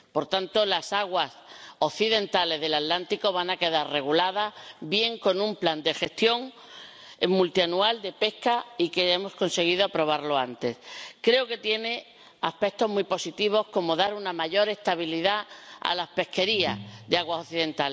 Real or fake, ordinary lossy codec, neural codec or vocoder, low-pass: real; none; none; none